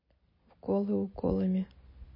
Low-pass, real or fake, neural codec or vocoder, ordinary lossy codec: 5.4 kHz; real; none; MP3, 24 kbps